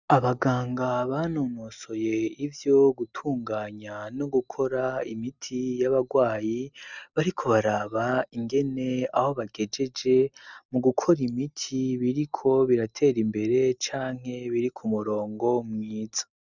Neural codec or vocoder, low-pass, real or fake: none; 7.2 kHz; real